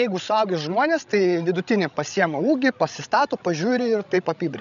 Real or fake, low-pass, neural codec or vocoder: fake; 7.2 kHz; codec, 16 kHz, 16 kbps, FreqCodec, larger model